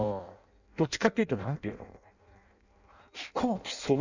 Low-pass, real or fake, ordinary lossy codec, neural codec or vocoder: 7.2 kHz; fake; none; codec, 16 kHz in and 24 kHz out, 0.6 kbps, FireRedTTS-2 codec